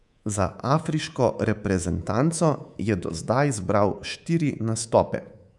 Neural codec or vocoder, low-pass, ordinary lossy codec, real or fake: codec, 24 kHz, 3.1 kbps, DualCodec; 10.8 kHz; none; fake